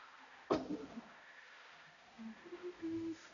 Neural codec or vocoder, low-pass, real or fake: codec, 16 kHz, 0.5 kbps, X-Codec, HuBERT features, trained on balanced general audio; 7.2 kHz; fake